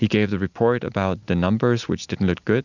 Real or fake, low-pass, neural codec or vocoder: real; 7.2 kHz; none